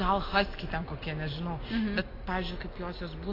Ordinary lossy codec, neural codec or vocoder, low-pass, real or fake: AAC, 24 kbps; none; 5.4 kHz; real